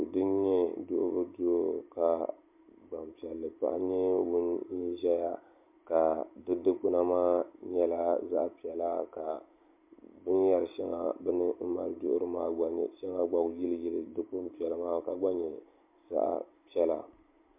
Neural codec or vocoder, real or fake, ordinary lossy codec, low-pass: none; real; MP3, 32 kbps; 3.6 kHz